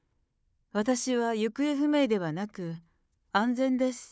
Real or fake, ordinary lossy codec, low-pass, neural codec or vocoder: fake; none; none; codec, 16 kHz, 4 kbps, FunCodec, trained on Chinese and English, 50 frames a second